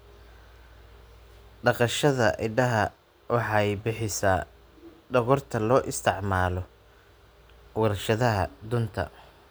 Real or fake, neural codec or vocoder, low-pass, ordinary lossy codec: real; none; none; none